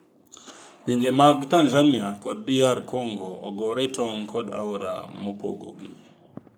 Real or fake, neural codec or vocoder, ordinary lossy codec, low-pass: fake; codec, 44.1 kHz, 3.4 kbps, Pupu-Codec; none; none